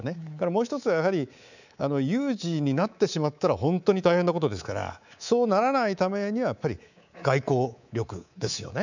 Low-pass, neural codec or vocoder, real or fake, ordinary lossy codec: 7.2 kHz; codec, 24 kHz, 3.1 kbps, DualCodec; fake; none